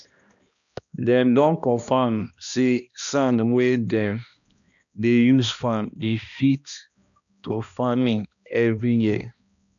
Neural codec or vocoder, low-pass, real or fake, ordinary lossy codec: codec, 16 kHz, 1 kbps, X-Codec, HuBERT features, trained on balanced general audio; 7.2 kHz; fake; none